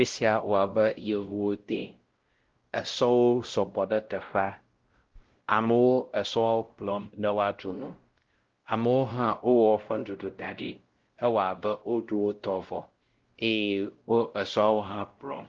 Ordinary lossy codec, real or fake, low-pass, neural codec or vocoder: Opus, 16 kbps; fake; 7.2 kHz; codec, 16 kHz, 0.5 kbps, X-Codec, WavLM features, trained on Multilingual LibriSpeech